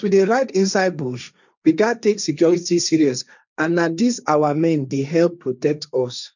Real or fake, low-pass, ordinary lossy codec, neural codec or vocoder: fake; 7.2 kHz; none; codec, 16 kHz, 1.1 kbps, Voila-Tokenizer